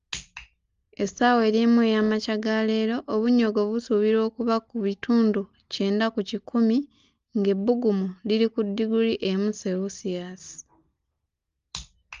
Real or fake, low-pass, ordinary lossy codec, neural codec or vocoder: real; 7.2 kHz; Opus, 32 kbps; none